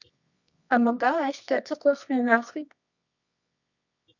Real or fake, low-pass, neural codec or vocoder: fake; 7.2 kHz; codec, 24 kHz, 0.9 kbps, WavTokenizer, medium music audio release